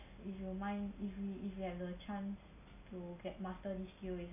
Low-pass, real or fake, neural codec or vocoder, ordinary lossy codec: 3.6 kHz; real; none; none